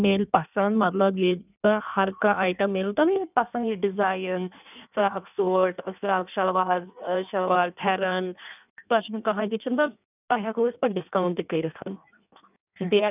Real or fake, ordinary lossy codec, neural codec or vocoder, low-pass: fake; none; codec, 16 kHz in and 24 kHz out, 1.1 kbps, FireRedTTS-2 codec; 3.6 kHz